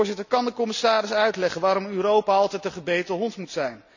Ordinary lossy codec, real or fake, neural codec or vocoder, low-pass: none; real; none; 7.2 kHz